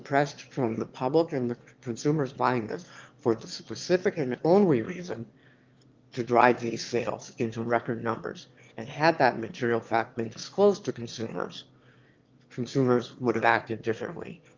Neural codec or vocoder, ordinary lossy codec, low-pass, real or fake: autoencoder, 22.05 kHz, a latent of 192 numbers a frame, VITS, trained on one speaker; Opus, 32 kbps; 7.2 kHz; fake